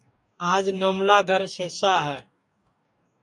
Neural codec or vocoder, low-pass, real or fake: codec, 44.1 kHz, 2.6 kbps, DAC; 10.8 kHz; fake